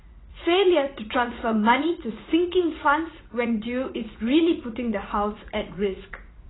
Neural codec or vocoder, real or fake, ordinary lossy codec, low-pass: vocoder, 44.1 kHz, 80 mel bands, Vocos; fake; AAC, 16 kbps; 7.2 kHz